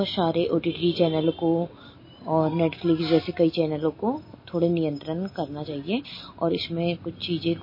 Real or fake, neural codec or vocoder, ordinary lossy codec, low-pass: real; none; MP3, 24 kbps; 5.4 kHz